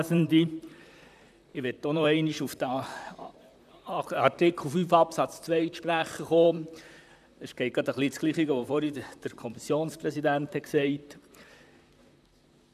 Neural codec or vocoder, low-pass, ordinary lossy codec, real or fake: vocoder, 44.1 kHz, 128 mel bands, Pupu-Vocoder; 14.4 kHz; none; fake